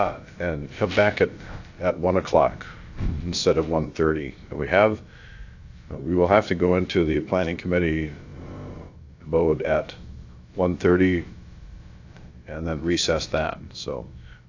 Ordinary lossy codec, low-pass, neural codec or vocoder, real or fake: AAC, 48 kbps; 7.2 kHz; codec, 16 kHz, about 1 kbps, DyCAST, with the encoder's durations; fake